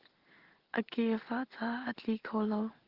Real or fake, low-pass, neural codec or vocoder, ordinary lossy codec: real; 5.4 kHz; none; Opus, 16 kbps